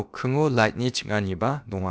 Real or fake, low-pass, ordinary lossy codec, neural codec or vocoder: fake; none; none; codec, 16 kHz, about 1 kbps, DyCAST, with the encoder's durations